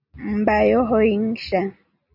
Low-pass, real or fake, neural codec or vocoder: 5.4 kHz; real; none